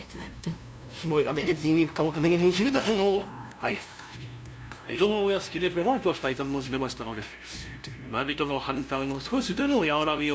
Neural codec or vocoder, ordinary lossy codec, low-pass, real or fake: codec, 16 kHz, 0.5 kbps, FunCodec, trained on LibriTTS, 25 frames a second; none; none; fake